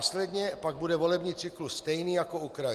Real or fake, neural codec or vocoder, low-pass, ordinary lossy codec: real; none; 14.4 kHz; Opus, 32 kbps